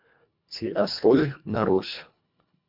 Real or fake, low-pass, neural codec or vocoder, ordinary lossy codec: fake; 5.4 kHz; codec, 24 kHz, 1.5 kbps, HILCodec; MP3, 48 kbps